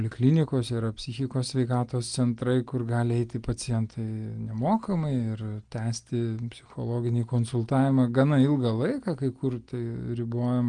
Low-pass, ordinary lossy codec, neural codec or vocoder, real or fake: 9.9 kHz; Opus, 24 kbps; none; real